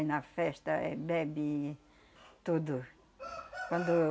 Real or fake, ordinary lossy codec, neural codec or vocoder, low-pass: real; none; none; none